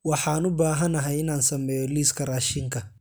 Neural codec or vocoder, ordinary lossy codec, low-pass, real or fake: none; none; none; real